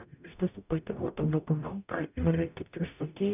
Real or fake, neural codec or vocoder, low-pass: fake; codec, 44.1 kHz, 0.9 kbps, DAC; 3.6 kHz